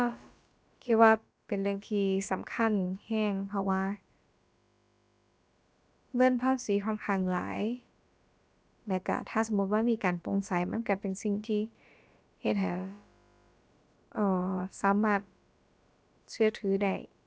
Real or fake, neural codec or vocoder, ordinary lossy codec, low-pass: fake; codec, 16 kHz, about 1 kbps, DyCAST, with the encoder's durations; none; none